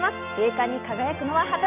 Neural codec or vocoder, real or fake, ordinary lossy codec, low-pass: none; real; none; 3.6 kHz